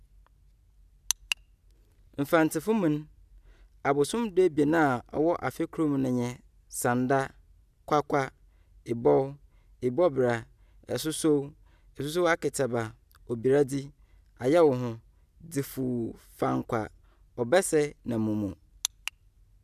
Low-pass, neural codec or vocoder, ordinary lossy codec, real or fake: 14.4 kHz; none; none; real